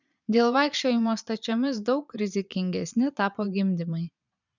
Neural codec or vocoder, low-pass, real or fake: vocoder, 22.05 kHz, 80 mel bands, Vocos; 7.2 kHz; fake